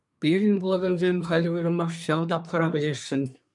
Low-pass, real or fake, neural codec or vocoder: 10.8 kHz; fake; codec, 24 kHz, 1 kbps, SNAC